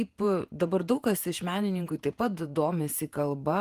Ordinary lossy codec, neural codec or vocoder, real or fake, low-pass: Opus, 24 kbps; vocoder, 48 kHz, 128 mel bands, Vocos; fake; 14.4 kHz